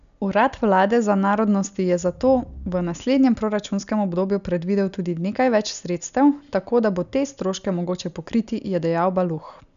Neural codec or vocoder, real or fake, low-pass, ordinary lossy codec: none; real; 7.2 kHz; none